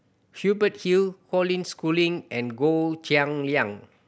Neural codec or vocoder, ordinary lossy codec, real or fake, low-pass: none; none; real; none